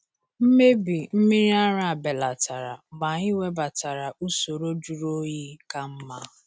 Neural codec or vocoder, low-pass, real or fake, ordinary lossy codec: none; none; real; none